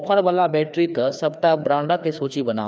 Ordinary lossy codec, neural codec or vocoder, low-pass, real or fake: none; codec, 16 kHz, 2 kbps, FreqCodec, larger model; none; fake